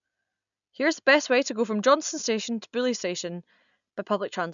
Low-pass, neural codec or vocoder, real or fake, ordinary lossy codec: 7.2 kHz; none; real; none